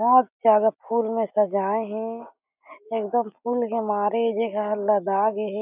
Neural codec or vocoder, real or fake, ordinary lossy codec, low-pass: none; real; none; 3.6 kHz